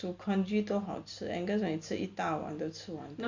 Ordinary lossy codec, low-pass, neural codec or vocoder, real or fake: Opus, 64 kbps; 7.2 kHz; none; real